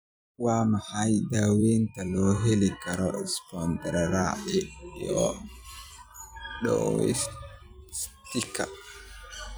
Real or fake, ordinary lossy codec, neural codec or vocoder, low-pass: fake; none; vocoder, 44.1 kHz, 128 mel bands every 256 samples, BigVGAN v2; none